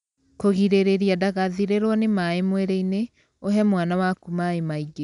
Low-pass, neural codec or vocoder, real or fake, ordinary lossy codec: 10.8 kHz; none; real; none